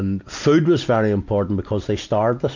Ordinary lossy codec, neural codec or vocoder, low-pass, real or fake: MP3, 64 kbps; none; 7.2 kHz; real